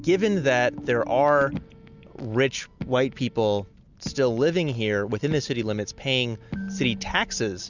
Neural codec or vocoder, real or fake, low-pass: none; real; 7.2 kHz